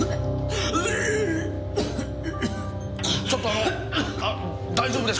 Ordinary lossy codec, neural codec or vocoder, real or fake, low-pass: none; none; real; none